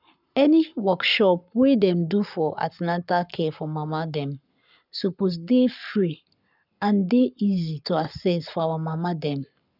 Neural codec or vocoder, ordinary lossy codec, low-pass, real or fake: codec, 44.1 kHz, 7.8 kbps, Pupu-Codec; none; 5.4 kHz; fake